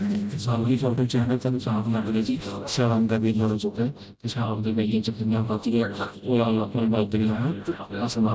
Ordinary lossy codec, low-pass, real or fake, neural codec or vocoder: none; none; fake; codec, 16 kHz, 0.5 kbps, FreqCodec, smaller model